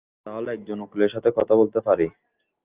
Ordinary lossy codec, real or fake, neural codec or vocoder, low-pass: Opus, 32 kbps; real; none; 3.6 kHz